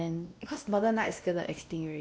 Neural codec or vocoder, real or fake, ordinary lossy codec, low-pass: codec, 16 kHz, 1 kbps, X-Codec, WavLM features, trained on Multilingual LibriSpeech; fake; none; none